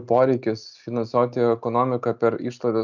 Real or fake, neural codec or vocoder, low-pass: real; none; 7.2 kHz